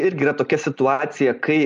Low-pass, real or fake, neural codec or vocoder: 10.8 kHz; real; none